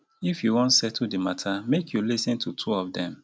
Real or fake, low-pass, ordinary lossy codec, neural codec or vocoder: real; none; none; none